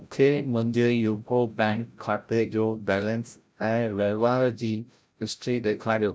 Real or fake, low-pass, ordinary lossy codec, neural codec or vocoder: fake; none; none; codec, 16 kHz, 0.5 kbps, FreqCodec, larger model